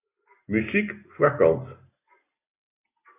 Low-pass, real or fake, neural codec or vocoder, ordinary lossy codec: 3.6 kHz; real; none; AAC, 32 kbps